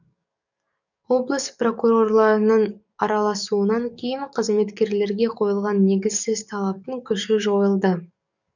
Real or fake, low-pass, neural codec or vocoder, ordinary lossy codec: fake; 7.2 kHz; codec, 44.1 kHz, 7.8 kbps, DAC; none